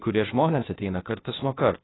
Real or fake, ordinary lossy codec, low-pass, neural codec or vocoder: fake; AAC, 16 kbps; 7.2 kHz; codec, 16 kHz, 0.8 kbps, ZipCodec